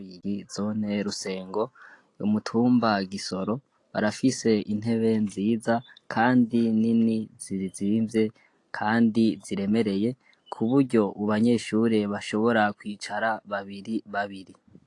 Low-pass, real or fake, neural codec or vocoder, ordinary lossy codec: 10.8 kHz; real; none; AAC, 48 kbps